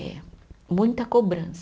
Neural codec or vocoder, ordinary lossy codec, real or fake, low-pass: none; none; real; none